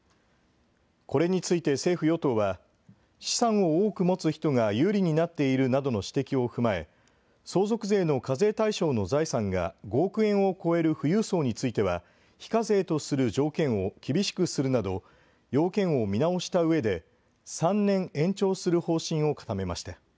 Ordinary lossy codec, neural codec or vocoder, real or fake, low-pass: none; none; real; none